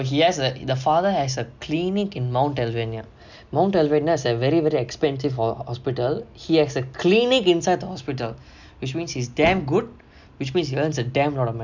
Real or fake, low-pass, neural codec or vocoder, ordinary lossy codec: real; 7.2 kHz; none; none